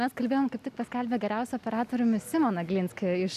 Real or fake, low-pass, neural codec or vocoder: real; 14.4 kHz; none